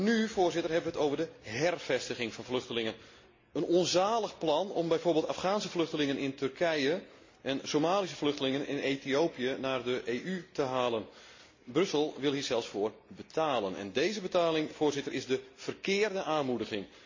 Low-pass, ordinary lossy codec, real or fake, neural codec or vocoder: 7.2 kHz; MP3, 32 kbps; real; none